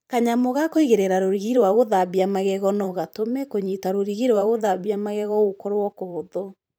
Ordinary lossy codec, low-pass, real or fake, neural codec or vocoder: none; none; fake; vocoder, 44.1 kHz, 128 mel bands every 512 samples, BigVGAN v2